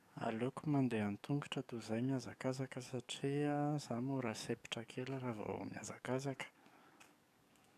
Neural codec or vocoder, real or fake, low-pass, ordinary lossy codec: codec, 44.1 kHz, 7.8 kbps, DAC; fake; 14.4 kHz; none